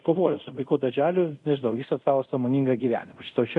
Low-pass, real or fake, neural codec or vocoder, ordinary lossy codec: 10.8 kHz; fake; codec, 24 kHz, 0.5 kbps, DualCodec; MP3, 96 kbps